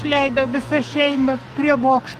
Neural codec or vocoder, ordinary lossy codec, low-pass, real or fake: codec, 44.1 kHz, 2.6 kbps, SNAC; Opus, 32 kbps; 14.4 kHz; fake